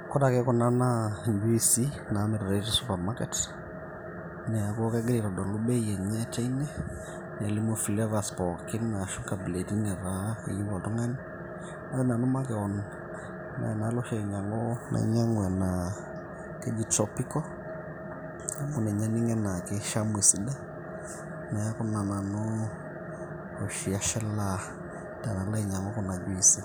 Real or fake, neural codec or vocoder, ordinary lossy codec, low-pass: real; none; none; none